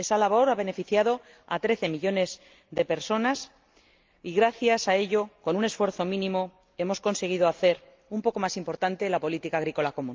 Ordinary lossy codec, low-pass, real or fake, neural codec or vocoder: Opus, 24 kbps; 7.2 kHz; real; none